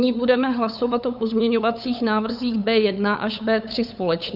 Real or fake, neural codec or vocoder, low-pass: fake; codec, 16 kHz, 8 kbps, FunCodec, trained on LibriTTS, 25 frames a second; 5.4 kHz